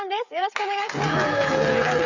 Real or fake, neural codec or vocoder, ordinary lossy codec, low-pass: fake; codec, 16 kHz, 8 kbps, FreqCodec, smaller model; none; 7.2 kHz